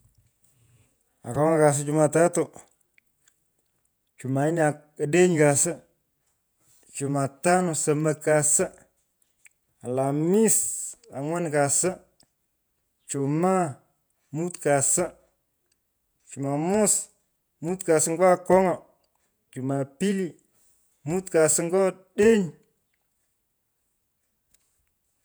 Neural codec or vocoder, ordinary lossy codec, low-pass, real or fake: vocoder, 48 kHz, 128 mel bands, Vocos; none; none; fake